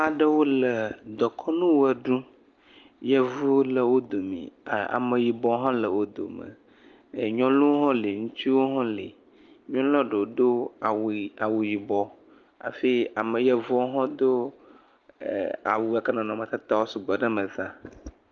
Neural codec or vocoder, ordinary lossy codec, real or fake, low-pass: none; Opus, 24 kbps; real; 7.2 kHz